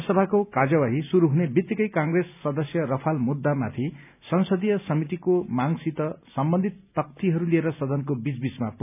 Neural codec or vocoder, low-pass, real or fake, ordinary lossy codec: none; 3.6 kHz; real; none